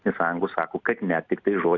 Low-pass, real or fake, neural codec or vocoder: 7.2 kHz; real; none